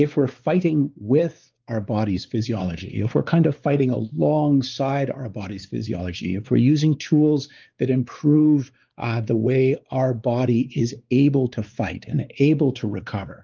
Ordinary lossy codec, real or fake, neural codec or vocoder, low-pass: Opus, 24 kbps; fake; codec, 16 kHz, 4 kbps, X-Codec, WavLM features, trained on Multilingual LibriSpeech; 7.2 kHz